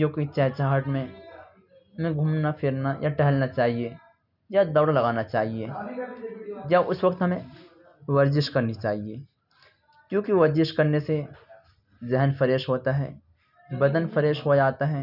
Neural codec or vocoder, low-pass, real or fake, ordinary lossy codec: none; 5.4 kHz; real; none